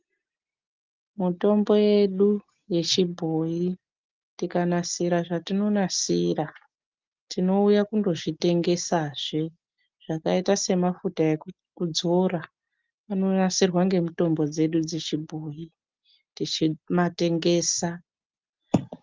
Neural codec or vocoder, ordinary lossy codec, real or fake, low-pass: none; Opus, 24 kbps; real; 7.2 kHz